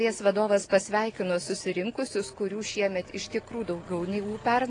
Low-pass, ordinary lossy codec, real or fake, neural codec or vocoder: 9.9 kHz; AAC, 32 kbps; real; none